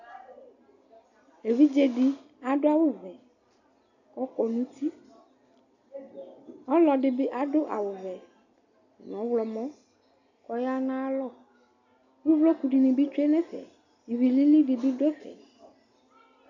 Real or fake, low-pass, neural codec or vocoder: real; 7.2 kHz; none